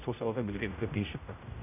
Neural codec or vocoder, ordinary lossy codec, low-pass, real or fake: codec, 16 kHz, 0.5 kbps, X-Codec, HuBERT features, trained on general audio; none; 3.6 kHz; fake